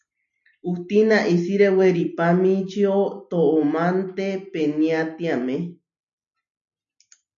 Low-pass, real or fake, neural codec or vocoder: 7.2 kHz; real; none